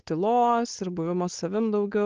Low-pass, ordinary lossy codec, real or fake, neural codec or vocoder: 7.2 kHz; Opus, 16 kbps; fake; codec, 16 kHz, 4.8 kbps, FACodec